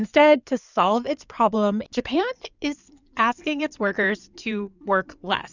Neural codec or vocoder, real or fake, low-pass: codec, 16 kHz in and 24 kHz out, 2.2 kbps, FireRedTTS-2 codec; fake; 7.2 kHz